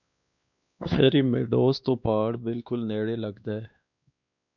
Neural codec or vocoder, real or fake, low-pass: codec, 16 kHz, 2 kbps, X-Codec, WavLM features, trained on Multilingual LibriSpeech; fake; 7.2 kHz